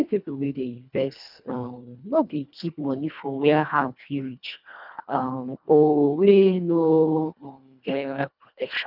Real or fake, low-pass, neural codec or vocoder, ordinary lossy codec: fake; 5.4 kHz; codec, 24 kHz, 1.5 kbps, HILCodec; none